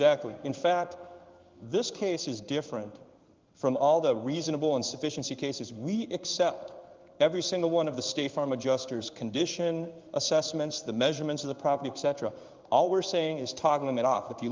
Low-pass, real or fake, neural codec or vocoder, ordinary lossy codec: 7.2 kHz; fake; codec, 16 kHz in and 24 kHz out, 1 kbps, XY-Tokenizer; Opus, 32 kbps